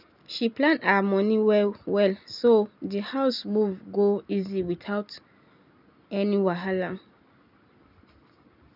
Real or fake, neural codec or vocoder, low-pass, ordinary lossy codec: real; none; 5.4 kHz; none